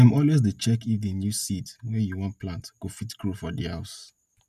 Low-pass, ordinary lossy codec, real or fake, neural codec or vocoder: 14.4 kHz; none; real; none